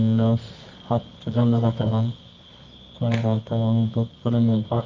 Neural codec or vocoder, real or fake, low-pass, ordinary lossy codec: codec, 24 kHz, 0.9 kbps, WavTokenizer, medium music audio release; fake; 7.2 kHz; Opus, 24 kbps